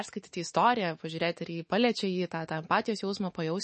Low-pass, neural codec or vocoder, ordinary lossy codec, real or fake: 10.8 kHz; autoencoder, 48 kHz, 128 numbers a frame, DAC-VAE, trained on Japanese speech; MP3, 32 kbps; fake